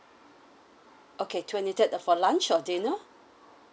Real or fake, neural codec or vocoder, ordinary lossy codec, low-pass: real; none; none; none